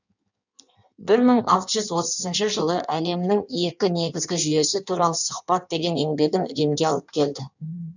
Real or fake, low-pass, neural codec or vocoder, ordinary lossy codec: fake; 7.2 kHz; codec, 16 kHz in and 24 kHz out, 1.1 kbps, FireRedTTS-2 codec; none